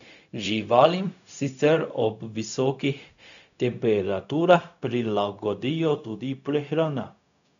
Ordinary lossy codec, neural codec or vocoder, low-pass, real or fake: none; codec, 16 kHz, 0.4 kbps, LongCat-Audio-Codec; 7.2 kHz; fake